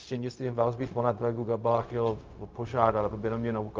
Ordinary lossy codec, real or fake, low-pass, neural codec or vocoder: Opus, 24 kbps; fake; 7.2 kHz; codec, 16 kHz, 0.4 kbps, LongCat-Audio-Codec